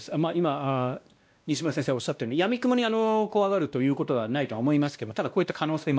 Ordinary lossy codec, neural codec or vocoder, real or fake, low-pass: none; codec, 16 kHz, 1 kbps, X-Codec, WavLM features, trained on Multilingual LibriSpeech; fake; none